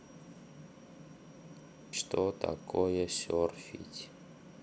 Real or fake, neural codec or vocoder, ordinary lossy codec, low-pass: real; none; none; none